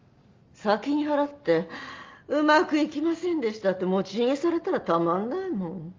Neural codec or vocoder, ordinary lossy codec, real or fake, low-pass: none; Opus, 32 kbps; real; 7.2 kHz